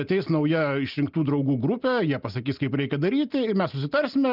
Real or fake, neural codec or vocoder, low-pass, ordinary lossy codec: real; none; 5.4 kHz; Opus, 24 kbps